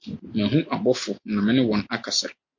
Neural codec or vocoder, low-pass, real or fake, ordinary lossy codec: none; 7.2 kHz; real; MP3, 48 kbps